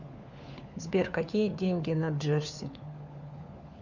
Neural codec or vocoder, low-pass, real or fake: codec, 16 kHz, 4 kbps, FunCodec, trained on LibriTTS, 50 frames a second; 7.2 kHz; fake